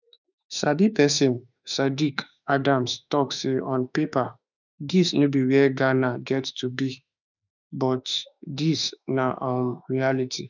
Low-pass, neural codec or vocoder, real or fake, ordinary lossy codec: 7.2 kHz; autoencoder, 48 kHz, 32 numbers a frame, DAC-VAE, trained on Japanese speech; fake; none